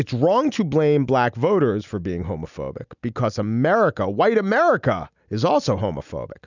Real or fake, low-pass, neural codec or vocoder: real; 7.2 kHz; none